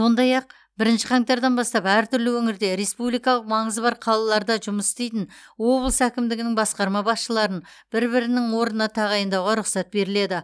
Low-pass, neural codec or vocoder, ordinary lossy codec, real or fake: none; none; none; real